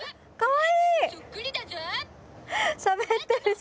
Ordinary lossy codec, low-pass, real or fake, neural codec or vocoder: none; none; real; none